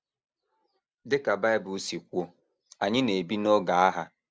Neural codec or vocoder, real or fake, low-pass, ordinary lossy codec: none; real; none; none